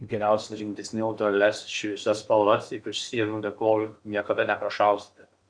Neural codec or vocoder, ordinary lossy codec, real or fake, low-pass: codec, 16 kHz in and 24 kHz out, 0.8 kbps, FocalCodec, streaming, 65536 codes; Opus, 64 kbps; fake; 9.9 kHz